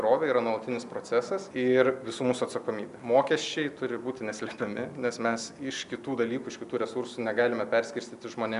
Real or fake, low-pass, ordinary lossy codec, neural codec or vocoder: real; 10.8 kHz; AAC, 96 kbps; none